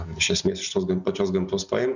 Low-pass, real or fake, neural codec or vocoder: 7.2 kHz; real; none